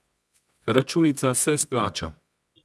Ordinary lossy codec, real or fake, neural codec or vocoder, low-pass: none; fake; codec, 24 kHz, 0.9 kbps, WavTokenizer, medium music audio release; none